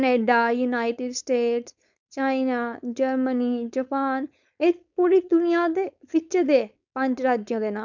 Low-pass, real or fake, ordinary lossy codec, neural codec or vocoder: 7.2 kHz; fake; none; codec, 16 kHz, 4.8 kbps, FACodec